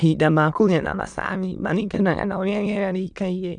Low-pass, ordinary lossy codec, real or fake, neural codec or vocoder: 9.9 kHz; none; fake; autoencoder, 22.05 kHz, a latent of 192 numbers a frame, VITS, trained on many speakers